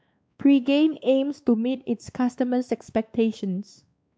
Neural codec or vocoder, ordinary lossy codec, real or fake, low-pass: codec, 16 kHz, 2 kbps, X-Codec, WavLM features, trained on Multilingual LibriSpeech; none; fake; none